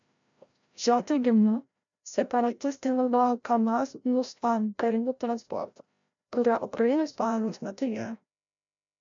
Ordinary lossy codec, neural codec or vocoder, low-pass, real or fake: MP3, 64 kbps; codec, 16 kHz, 0.5 kbps, FreqCodec, larger model; 7.2 kHz; fake